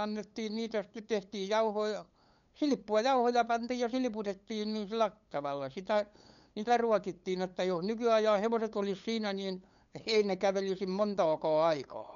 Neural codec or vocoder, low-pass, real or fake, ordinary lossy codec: codec, 16 kHz, 8 kbps, FunCodec, trained on LibriTTS, 25 frames a second; 7.2 kHz; fake; none